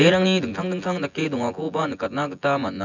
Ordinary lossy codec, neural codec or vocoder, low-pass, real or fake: none; vocoder, 24 kHz, 100 mel bands, Vocos; 7.2 kHz; fake